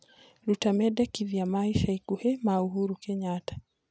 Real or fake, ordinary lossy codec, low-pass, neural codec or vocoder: real; none; none; none